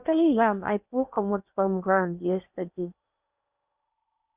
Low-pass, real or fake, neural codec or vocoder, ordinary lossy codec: 3.6 kHz; fake; codec, 16 kHz in and 24 kHz out, 0.6 kbps, FocalCodec, streaming, 4096 codes; Opus, 64 kbps